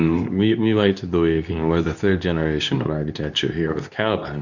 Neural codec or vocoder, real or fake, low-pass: codec, 24 kHz, 0.9 kbps, WavTokenizer, medium speech release version 2; fake; 7.2 kHz